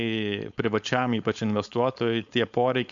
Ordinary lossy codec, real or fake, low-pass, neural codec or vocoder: MP3, 64 kbps; fake; 7.2 kHz; codec, 16 kHz, 4.8 kbps, FACodec